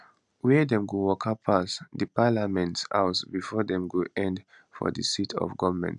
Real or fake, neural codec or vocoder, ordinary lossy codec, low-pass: real; none; none; 10.8 kHz